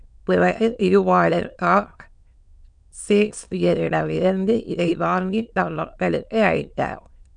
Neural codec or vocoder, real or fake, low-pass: autoencoder, 22.05 kHz, a latent of 192 numbers a frame, VITS, trained on many speakers; fake; 9.9 kHz